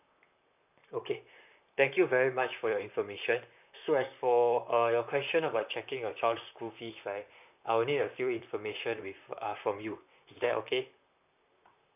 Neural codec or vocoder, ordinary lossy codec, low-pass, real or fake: vocoder, 44.1 kHz, 128 mel bands, Pupu-Vocoder; none; 3.6 kHz; fake